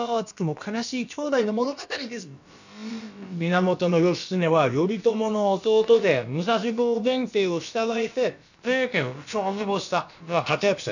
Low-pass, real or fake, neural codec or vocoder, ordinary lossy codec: 7.2 kHz; fake; codec, 16 kHz, about 1 kbps, DyCAST, with the encoder's durations; none